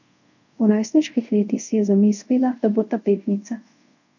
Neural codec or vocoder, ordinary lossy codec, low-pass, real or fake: codec, 24 kHz, 0.5 kbps, DualCodec; none; 7.2 kHz; fake